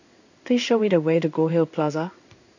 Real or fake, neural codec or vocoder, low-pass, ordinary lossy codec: fake; codec, 16 kHz in and 24 kHz out, 1 kbps, XY-Tokenizer; 7.2 kHz; none